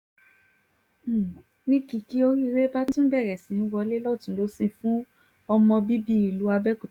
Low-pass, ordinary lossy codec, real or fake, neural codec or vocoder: 19.8 kHz; Opus, 64 kbps; fake; codec, 44.1 kHz, 7.8 kbps, DAC